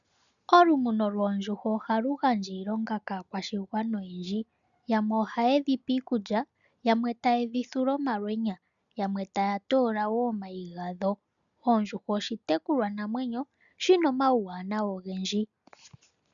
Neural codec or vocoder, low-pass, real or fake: none; 7.2 kHz; real